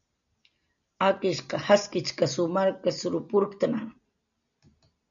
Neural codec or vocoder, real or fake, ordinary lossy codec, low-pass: none; real; MP3, 96 kbps; 7.2 kHz